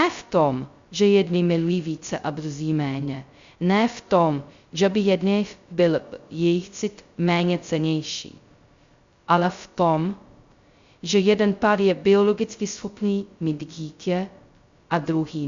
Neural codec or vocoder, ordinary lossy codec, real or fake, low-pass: codec, 16 kHz, 0.2 kbps, FocalCodec; Opus, 64 kbps; fake; 7.2 kHz